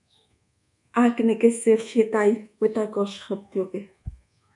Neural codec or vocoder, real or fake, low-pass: codec, 24 kHz, 1.2 kbps, DualCodec; fake; 10.8 kHz